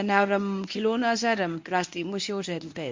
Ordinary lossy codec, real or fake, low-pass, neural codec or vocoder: MP3, 48 kbps; fake; 7.2 kHz; codec, 24 kHz, 0.9 kbps, WavTokenizer, medium speech release version 1